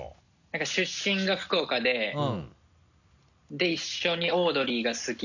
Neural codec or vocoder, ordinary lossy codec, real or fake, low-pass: none; none; real; 7.2 kHz